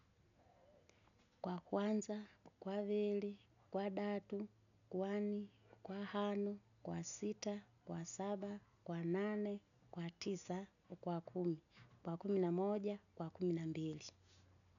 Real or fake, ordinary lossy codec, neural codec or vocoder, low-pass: real; none; none; 7.2 kHz